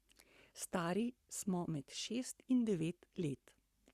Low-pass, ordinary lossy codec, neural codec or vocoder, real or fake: 14.4 kHz; Opus, 64 kbps; codec, 44.1 kHz, 7.8 kbps, Pupu-Codec; fake